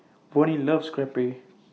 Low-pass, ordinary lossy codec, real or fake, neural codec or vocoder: none; none; real; none